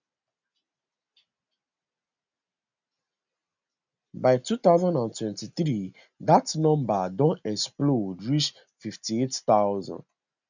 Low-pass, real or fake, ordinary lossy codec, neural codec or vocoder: 7.2 kHz; real; none; none